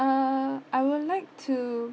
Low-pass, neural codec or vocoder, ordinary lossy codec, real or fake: none; none; none; real